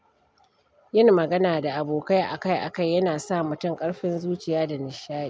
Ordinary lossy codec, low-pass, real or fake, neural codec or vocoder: none; none; real; none